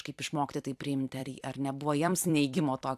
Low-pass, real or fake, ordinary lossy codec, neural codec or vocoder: 14.4 kHz; fake; AAC, 96 kbps; vocoder, 44.1 kHz, 128 mel bands every 256 samples, BigVGAN v2